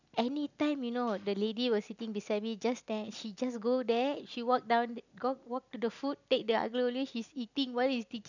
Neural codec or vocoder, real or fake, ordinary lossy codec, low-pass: none; real; none; 7.2 kHz